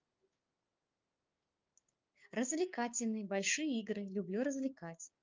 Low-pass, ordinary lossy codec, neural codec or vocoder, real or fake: 7.2 kHz; Opus, 24 kbps; codec, 16 kHz, 6 kbps, DAC; fake